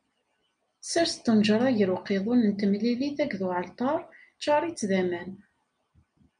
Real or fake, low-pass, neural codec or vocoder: real; 9.9 kHz; none